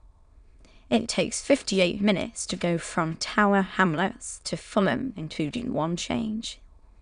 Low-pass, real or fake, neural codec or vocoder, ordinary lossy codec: 9.9 kHz; fake; autoencoder, 22.05 kHz, a latent of 192 numbers a frame, VITS, trained on many speakers; none